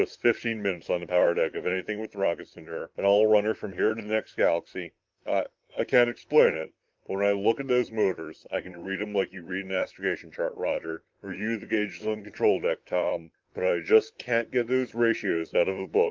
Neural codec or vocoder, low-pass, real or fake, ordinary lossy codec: vocoder, 44.1 kHz, 128 mel bands, Pupu-Vocoder; 7.2 kHz; fake; Opus, 24 kbps